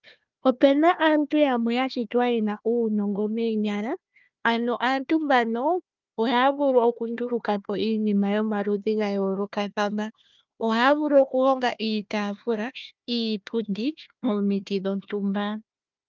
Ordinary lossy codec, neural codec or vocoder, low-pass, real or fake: Opus, 24 kbps; codec, 16 kHz, 1 kbps, FunCodec, trained on Chinese and English, 50 frames a second; 7.2 kHz; fake